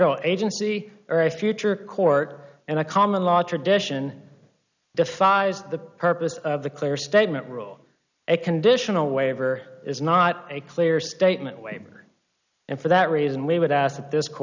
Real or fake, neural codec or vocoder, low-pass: real; none; 7.2 kHz